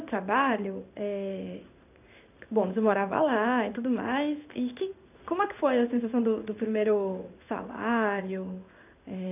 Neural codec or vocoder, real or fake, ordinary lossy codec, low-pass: codec, 16 kHz in and 24 kHz out, 1 kbps, XY-Tokenizer; fake; none; 3.6 kHz